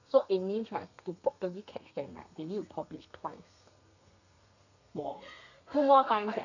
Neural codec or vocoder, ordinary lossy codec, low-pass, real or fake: codec, 32 kHz, 1.9 kbps, SNAC; none; 7.2 kHz; fake